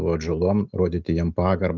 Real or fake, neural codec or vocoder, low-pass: real; none; 7.2 kHz